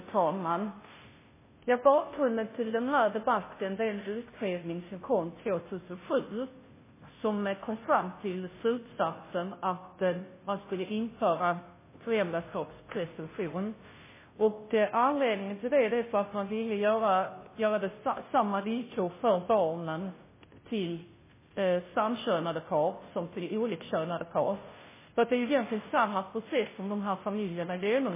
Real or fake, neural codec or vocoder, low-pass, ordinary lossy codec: fake; codec, 16 kHz, 0.5 kbps, FunCodec, trained on Chinese and English, 25 frames a second; 3.6 kHz; MP3, 16 kbps